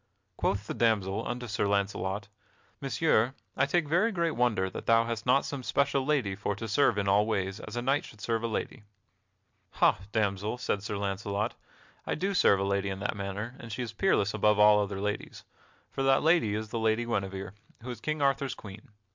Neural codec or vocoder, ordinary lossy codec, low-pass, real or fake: none; MP3, 64 kbps; 7.2 kHz; real